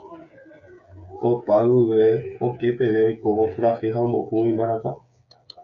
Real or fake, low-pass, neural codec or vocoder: fake; 7.2 kHz; codec, 16 kHz, 8 kbps, FreqCodec, smaller model